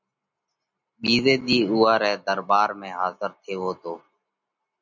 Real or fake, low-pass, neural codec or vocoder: real; 7.2 kHz; none